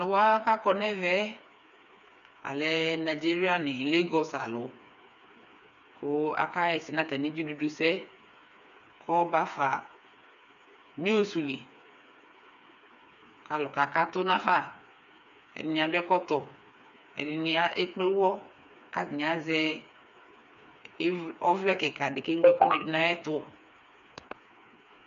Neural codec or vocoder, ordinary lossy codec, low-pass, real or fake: codec, 16 kHz, 4 kbps, FreqCodec, smaller model; MP3, 96 kbps; 7.2 kHz; fake